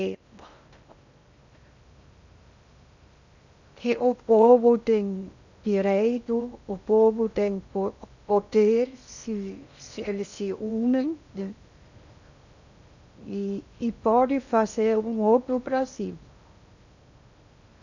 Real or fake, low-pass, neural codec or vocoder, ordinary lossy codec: fake; 7.2 kHz; codec, 16 kHz in and 24 kHz out, 0.6 kbps, FocalCodec, streaming, 2048 codes; none